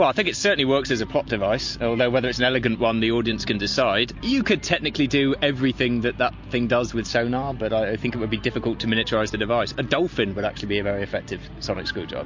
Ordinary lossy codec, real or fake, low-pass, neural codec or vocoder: MP3, 48 kbps; real; 7.2 kHz; none